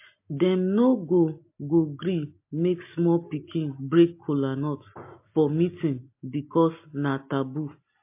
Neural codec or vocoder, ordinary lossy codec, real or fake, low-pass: none; MP3, 24 kbps; real; 3.6 kHz